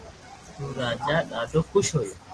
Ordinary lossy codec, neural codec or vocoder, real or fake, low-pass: Opus, 16 kbps; none; real; 10.8 kHz